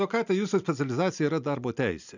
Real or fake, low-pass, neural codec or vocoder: real; 7.2 kHz; none